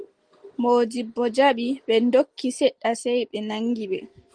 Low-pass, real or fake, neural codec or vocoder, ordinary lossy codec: 9.9 kHz; real; none; Opus, 24 kbps